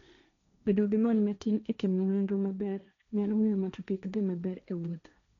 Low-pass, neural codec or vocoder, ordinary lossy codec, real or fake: 7.2 kHz; codec, 16 kHz, 1.1 kbps, Voila-Tokenizer; MP3, 96 kbps; fake